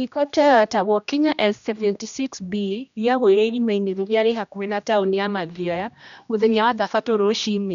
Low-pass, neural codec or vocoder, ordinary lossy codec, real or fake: 7.2 kHz; codec, 16 kHz, 1 kbps, X-Codec, HuBERT features, trained on general audio; none; fake